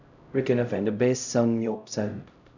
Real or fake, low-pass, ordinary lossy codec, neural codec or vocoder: fake; 7.2 kHz; none; codec, 16 kHz, 0.5 kbps, X-Codec, HuBERT features, trained on LibriSpeech